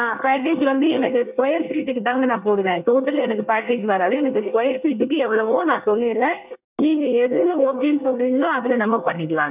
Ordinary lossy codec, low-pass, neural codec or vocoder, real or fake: none; 3.6 kHz; codec, 24 kHz, 1 kbps, SNAC; fake